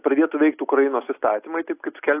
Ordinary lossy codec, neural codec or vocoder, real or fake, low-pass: AAC, 24 kbps; none; real; 3.6 kHz